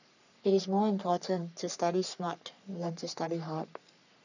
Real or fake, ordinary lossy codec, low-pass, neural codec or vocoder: fake; none; 7.2 kHz; codec, 44.1 kHz, 3.4 kbps, Pupu-Codec